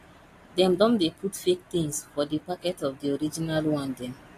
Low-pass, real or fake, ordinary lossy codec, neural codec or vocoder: 14.4 kHz; real; AAC, 48 kbps; none